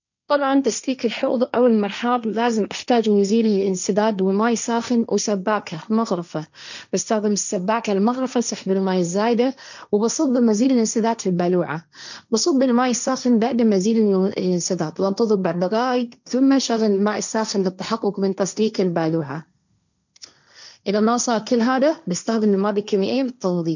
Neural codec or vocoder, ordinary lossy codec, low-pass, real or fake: codec, 16 kHz, 1.1 kbps, Voila-Tokenizer; none; 7.2 kHz; fake